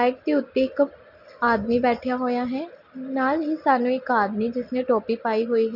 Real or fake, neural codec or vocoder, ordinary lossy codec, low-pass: real; none; none; 5.4 kHz